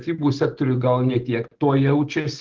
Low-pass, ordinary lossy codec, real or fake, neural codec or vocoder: 7.2 kHz; Opus, 16 kbps; real; none